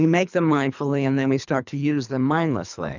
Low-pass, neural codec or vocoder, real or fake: 7.2 kHz; codec, 24 kHz, 3 kbps, HILCodec; fake